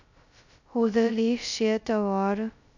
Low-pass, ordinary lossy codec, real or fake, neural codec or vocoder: 7.2 kHz; none; fake; codec, 16 kHz, 0.2 kbps, FocalCodec